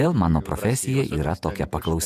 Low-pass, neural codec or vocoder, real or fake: 14.4 kHz; vocoder, 48 kHz, 128 mel bands, Vocos; fake